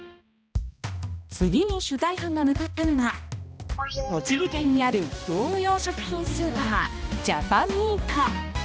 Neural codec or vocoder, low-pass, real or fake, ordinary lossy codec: codec, 16 kHz, 1 kbps, X-Codec, HuBERT features, trained on balanced general audio; none; fake; none